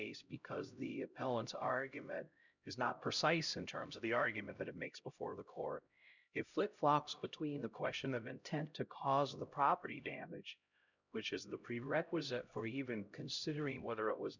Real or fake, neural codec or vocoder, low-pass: fake; codec, 16 kHz, 0.5 kbps, X-Codec, HuBERT features, trained on LibriSpeech; 7.2 kHz